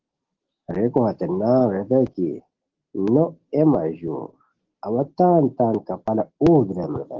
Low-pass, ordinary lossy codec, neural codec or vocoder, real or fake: 7.2 kHz; Opus, 16 kbps; none; real